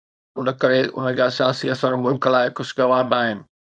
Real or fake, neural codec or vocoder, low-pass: fake; codec, 24 kHz, 0.9 kbps, WavTokenizer, small release; 9.9 kHz